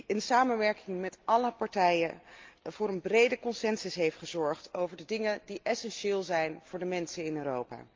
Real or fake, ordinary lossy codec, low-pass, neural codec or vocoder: real; Opus, 24 kbps; 7.2 kHz; none